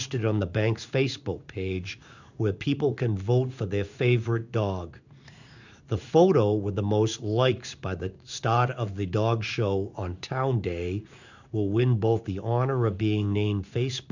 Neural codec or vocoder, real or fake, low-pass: codec, 16 kHz in and 24 kHz out, 1 kbps, XY-Tokenizer; fake; 7.2 kHz